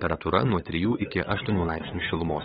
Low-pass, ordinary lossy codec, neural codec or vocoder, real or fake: 7.2 kHz; AAC, 16 kbps; codec, 16 kHz, 8 kbps, FunCodec, trained on LibriTTS, 25 frames a second; fake